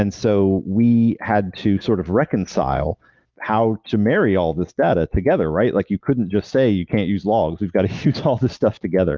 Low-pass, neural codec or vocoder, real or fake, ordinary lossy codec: 7.2 kHz; none; real; Opus, 32 kbps